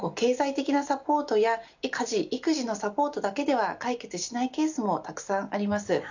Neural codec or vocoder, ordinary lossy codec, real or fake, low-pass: none; none; real; 7.2 kHz